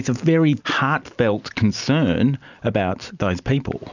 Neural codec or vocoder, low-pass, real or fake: none; 7.2 kHz; real